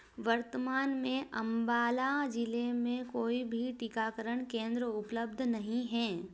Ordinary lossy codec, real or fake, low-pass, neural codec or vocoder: none; real; none; none